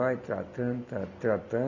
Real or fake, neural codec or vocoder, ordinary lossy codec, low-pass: real; none; none; 7.2 kHz